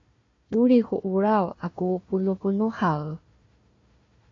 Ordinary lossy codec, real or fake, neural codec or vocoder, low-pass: AAC, 32 kbps; fake; codec, 16 kHz, 1 kbps, FunCodec, trained on Chinese and English, 50 frames a second; 7.2 kHz